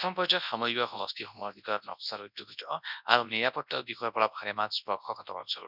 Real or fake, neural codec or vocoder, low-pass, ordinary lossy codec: fake; codec, 24 kHz, 0.9 kbps, WavTokenizer, large speech release; 5.4 kHz; none